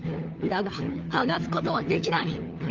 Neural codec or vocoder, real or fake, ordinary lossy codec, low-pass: codec, 16 kHz, 4 kbps, FunCodec, trained on LibriTTS, 50 frames a second; fake; Opus, 24 kbps; 7.2 kHz